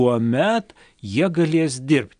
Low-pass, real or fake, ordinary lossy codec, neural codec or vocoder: 9.9 kHz; real; AAC, 96 kbps; none